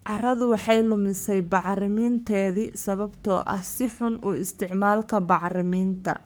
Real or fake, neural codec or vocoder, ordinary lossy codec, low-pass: fake; codec, 44.1 kHz, 3.4 kbps, Pupu-Codec; none; none